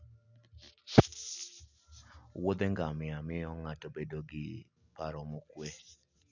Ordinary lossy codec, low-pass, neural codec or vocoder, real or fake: none; 7.2 kHz; none; real